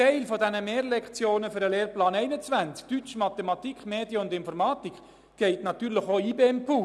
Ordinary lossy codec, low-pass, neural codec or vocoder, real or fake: none; none; none; real